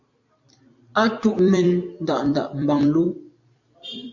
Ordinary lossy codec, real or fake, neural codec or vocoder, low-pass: MP3, 48 kbps; fake; vocoder, 44.1 kHz, 128 mel bands every 256 samples, BigVGAN v2; 7.2 kHz